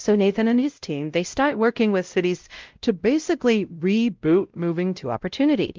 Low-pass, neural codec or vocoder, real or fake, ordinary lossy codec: 7.2 kHz; codec, 16 kHz, 0.5 kbps, X-Codec, WavLM features, trained on Multilingual LibriSpeech; fake; Opus, 24 kbps